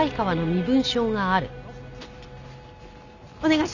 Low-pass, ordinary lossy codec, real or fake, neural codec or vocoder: 7.2 kHz; none; real; none